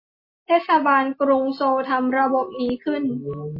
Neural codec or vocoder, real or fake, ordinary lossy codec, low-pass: none; real; MP3, 24 kbps; 5.4 kHz